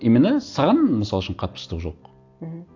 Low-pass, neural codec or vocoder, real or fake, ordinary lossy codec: 7.2 kHz; none; real; AAC, 48 kbps